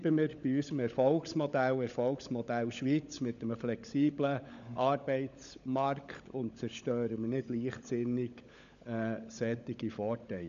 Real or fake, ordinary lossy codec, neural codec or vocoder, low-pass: fake; none; codec, 16 kHz, 16 kbps, FunCodec, trained on LibriTTS, 50 frames a second; 7.2 kHz